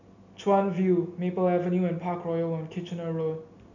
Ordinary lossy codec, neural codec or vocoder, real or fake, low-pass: none; none; real; 7.2 kHz